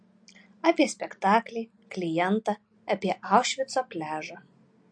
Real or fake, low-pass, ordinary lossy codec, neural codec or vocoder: real; 9.9 kHz; MP3, 48 kbps; none